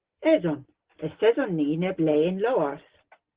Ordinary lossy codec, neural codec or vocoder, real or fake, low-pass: Opus, 16 kbps; none; real; 3.6 kHz